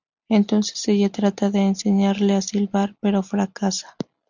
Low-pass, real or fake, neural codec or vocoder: 7.2 kHz; real; none